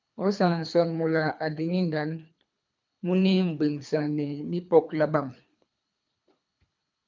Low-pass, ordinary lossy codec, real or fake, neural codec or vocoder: 7.2 kHz; MP3, 64 kbps; fake; codec, 24 kHz, 3 kbps, HILCodec